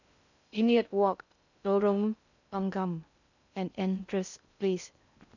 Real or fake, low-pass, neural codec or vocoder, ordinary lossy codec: fake; 7.2 kHz; codec, 16 kHz in and 24 kHz out, 0.6 kbps, FocalCodec, streaming, 2048 codes; none